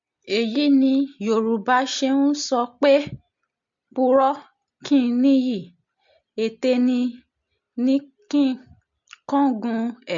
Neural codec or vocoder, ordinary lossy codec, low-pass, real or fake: none; MP3, 48 kbps; 7.2 kHz; real